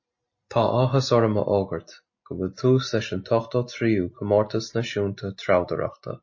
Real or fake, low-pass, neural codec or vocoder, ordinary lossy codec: real; 7.2 kHz; none; MP3, 32 kbps